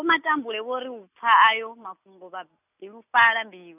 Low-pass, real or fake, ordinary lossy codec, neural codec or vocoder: 3.6 kHz; real; none; none